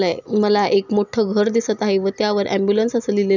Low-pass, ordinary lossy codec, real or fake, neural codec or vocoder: 7.2 kHz; none; real; none